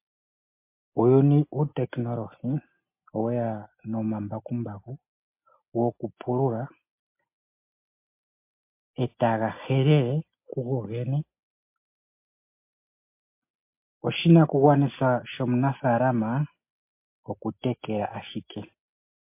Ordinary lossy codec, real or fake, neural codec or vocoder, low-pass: MP3, 24 kbps; real; none; 3.6 kHz